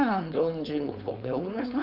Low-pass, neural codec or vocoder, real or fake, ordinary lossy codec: 5.4 kHz; codec, 16 kHz, 4.8 kbps, FACodec; fake; none